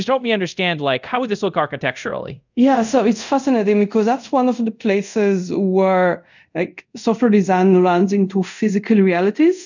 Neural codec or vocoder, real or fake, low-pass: codec, 24 kHz, 0.5 kbps, DualCodec; fake; 7.2 kHz